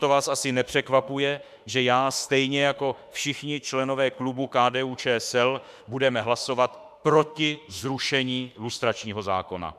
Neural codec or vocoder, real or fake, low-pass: autoencoder, 48 kHz, 32 numbers a frame, DAC-VAE, trained on Japanese speech; fake; 14.4 kHz